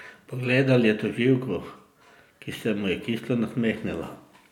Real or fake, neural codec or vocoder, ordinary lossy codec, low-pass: real; none; none; 19.8 kHz